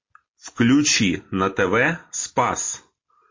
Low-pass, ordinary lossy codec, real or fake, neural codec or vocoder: 7.2 kHz; MP3, 32 kbps; real; none